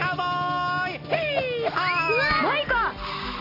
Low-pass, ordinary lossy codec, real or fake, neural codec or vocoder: 5.4 kHz; AAC, 24 kbps; real; none